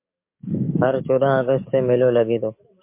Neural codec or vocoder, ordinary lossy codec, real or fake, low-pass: codec, 44.1 kHz, 7.8 kbps, DAC; MP3, 24 kbps; fake; 3.6 kHz